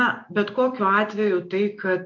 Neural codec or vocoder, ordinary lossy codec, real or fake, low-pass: none; MP3, 48 kbps; real; 7.2 kHz